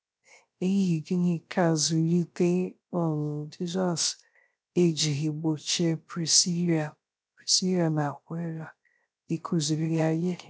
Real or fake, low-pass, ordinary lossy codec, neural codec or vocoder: fake; none; none; codec, 16 kHz, 0.3 kbps, FocalCodec